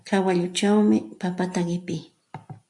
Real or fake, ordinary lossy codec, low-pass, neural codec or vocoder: real; AAC, 64 kbps; 10.8 kHz; none